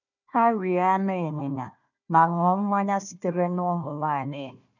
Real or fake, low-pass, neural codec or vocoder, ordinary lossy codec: fake; 7.2 kHz; codec, 16 kHz, 1 kbps, FunCodec, trained on Chinese and English, 50 frames a second; none